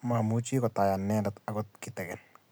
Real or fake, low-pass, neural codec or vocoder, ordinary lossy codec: real; none; none; none